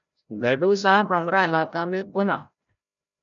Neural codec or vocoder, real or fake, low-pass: codec, 16 kHz, 0.5 kbps, FreqCodec, larger model; fake; 7.2 kHz